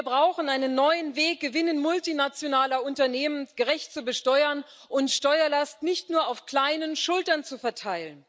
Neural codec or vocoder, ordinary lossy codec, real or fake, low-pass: none; none; real; none